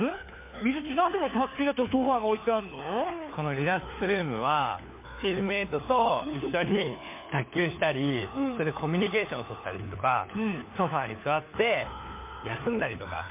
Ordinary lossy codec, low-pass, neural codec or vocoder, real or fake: MP3, 24 kbps; 3.6 kHz; codec, 16 kHz, 4 kbps, FunCodec, trained on LibriTTS, 50 frames a second; fake